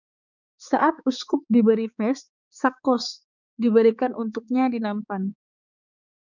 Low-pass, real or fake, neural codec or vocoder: 7.2 kHz; fake; codec, 16 kHz, 4 kbps, X-Codec, HuBERT features, trained on balanced general audio